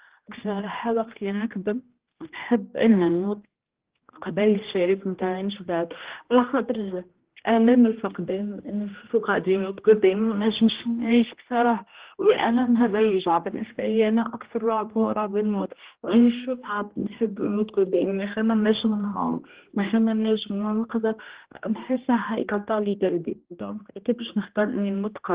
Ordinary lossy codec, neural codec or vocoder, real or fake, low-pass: Opus, 16 kbps; codec, 16 kHz, 1 kbps, X-Codec, HuBERT features, trained on general audio; fake; 3.6 kHz